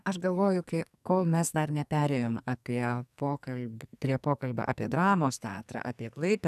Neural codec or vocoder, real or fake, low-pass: codec, 44.1 kHz, 2.6 kbps, SNAC; fake; 14.4 kHz